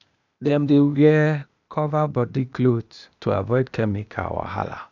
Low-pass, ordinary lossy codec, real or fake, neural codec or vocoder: 7.2 kHz; none; fake; codec, 16 kHz, 0.8 kbps, ZipCodec